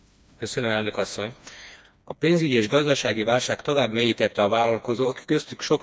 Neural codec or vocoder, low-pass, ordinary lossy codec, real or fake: codec, 16 kHz, 2 kbps, FreqCodec, smaller model; none; none; fake